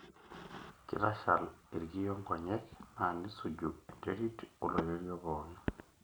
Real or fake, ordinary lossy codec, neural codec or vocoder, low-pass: real; none; none; none